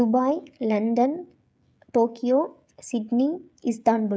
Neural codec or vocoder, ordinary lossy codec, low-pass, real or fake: codec, 16 kHz, 16 kbps, FreqCodec, smaller model; none; none; fake